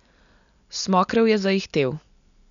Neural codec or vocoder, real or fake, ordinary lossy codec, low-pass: none; real; none; 7.2 kHz